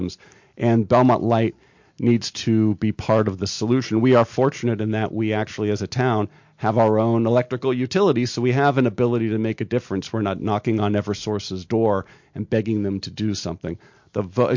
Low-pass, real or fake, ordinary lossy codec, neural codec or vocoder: 7.2 kHz; real; MP3, 48 kbps; none